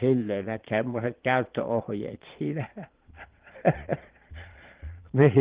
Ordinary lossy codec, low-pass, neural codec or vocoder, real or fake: Opus, 16 kbps; 3.6 kHz; vocoder, 22.05 kHz, 80 mel bands, Vocos; fake